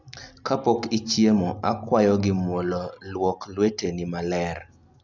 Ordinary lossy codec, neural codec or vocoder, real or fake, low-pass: none; none; real; 7.2 kHz